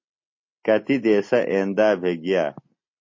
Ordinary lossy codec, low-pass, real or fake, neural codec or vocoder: MP3, 32 kbps; 7.2 kHz; real; none